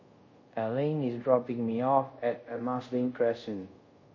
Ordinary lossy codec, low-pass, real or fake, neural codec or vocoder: MP3, 32 kbps; 7.2 kHz; fake; codec, 24 kHz, 0.5 kbps, DualCodec